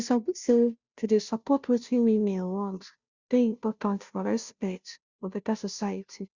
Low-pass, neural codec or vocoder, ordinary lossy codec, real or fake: 7.2 kHz; codec, 16 kHz, 0.5 kbps, FunCodec, trained on Chinese and English, 25 frames a second; Opus, 64 kbps; fake